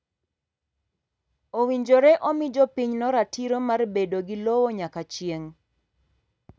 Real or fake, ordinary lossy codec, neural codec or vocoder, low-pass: real; none; none; none